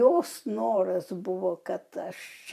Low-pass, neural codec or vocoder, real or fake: 14.4 kHz; vocoder, 44.1 kHz, 128 mel bands every 512 samples, BigVGAN v2; fake